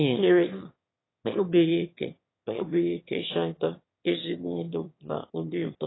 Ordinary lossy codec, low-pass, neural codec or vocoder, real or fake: AAC, 16 kbps; 7.2 kHz; autoencoder, 22.05 kHz, a latent of 192 numbers a frame, VITS, trained on one speaker; fake